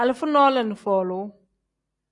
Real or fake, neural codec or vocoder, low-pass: real; none; 10.8 kHz